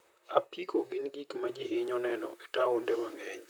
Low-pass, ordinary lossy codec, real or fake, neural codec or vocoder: none; none; fake; vocoder, 44.1 kHz, 128 mel bands, Pupu-Vocoder